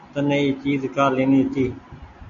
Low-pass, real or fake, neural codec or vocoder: 7.2 kHz; real; none